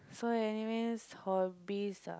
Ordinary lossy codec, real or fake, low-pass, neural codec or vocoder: none; real; none; none